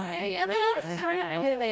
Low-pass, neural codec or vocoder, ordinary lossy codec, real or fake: none; codec, 16 kHz, 0.5 kbps, FreqCodec, larger model; none; fake